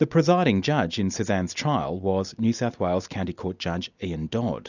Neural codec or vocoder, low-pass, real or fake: none; 7.2 kHz; real